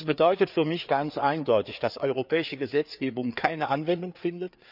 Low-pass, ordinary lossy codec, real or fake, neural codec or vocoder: 5.4 kHz; none; fake; codec, 16 kHz, 4 kbps, FreqCodec, larger model